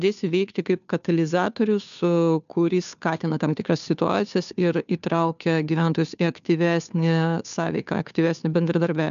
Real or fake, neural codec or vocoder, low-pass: fake; codec, 16 kHz, 2 kbps, FunCodec, trained on Chinese and English, 25 frames a second; 7.2 kHz